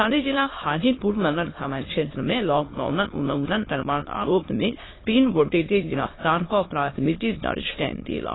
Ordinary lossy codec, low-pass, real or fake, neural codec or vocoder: AAC, 16 kbps; 7.2 kHz; fake; autoencoder, 22.05 kHz, a latent of 192 numbers a frame, VITS, trained on many speakers